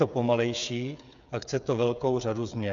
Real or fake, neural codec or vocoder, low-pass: fake; codec, 16 kHz, 8 kbps, FreqCodec, smaller model; 7.2 kHz